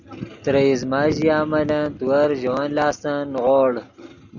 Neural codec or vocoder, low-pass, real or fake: none; 7.2 kHz; real